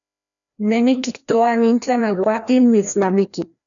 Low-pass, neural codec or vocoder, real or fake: 7.2 kHz; codec, 16 kHz, 1 kbps, FreqCodec, larger model; fake